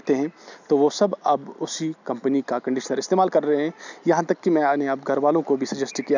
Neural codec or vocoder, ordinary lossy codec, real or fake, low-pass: none; none; real; 7.2 kHz